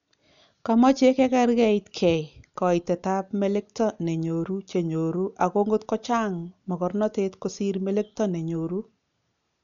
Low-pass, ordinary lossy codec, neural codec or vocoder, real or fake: 7.2 kHz; none; none; real